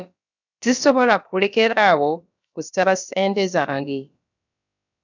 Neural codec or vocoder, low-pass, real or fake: codec, 16 kHz, about 1 kbps, DyCAST, with the encoder's durations; 7.2 kHz; fake